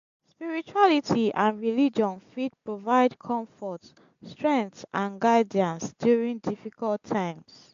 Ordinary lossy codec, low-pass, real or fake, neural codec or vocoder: AAC, 64 kbps; 7.2 kHz; real; none